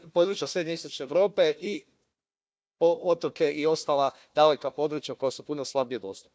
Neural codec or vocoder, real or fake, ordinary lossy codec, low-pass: codec, 16 kHz, 1 kbps, FunCodec, trained on Chinese and English, 50 frames a second; fake; none; none